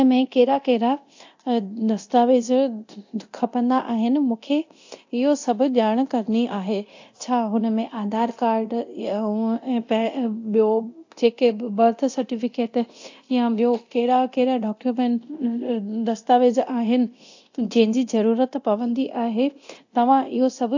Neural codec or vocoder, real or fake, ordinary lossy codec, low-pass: codec, 24 kHz, 0.9 kbps, DualCodec; fake; AAC, 48 kbps; 7.2 kHz